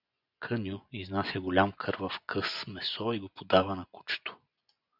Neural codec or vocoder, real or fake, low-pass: vocoder, 24 kHz, 100 mel bands, Vocos; fake; 5.4 kHz